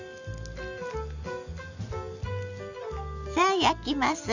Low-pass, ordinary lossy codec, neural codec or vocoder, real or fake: 7.2 kHz; none; none; real